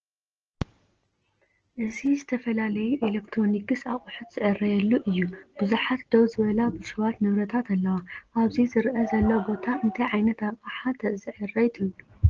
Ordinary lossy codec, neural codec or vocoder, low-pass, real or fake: Opus, 16 kbps; none; 7.2 kHz; real